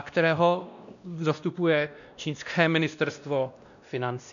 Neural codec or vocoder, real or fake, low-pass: codec, 16 kHz, 1 kbps, X-Codec, WavLM features, trained on Multilingual LibriSpeech; fake; 7.2 kHz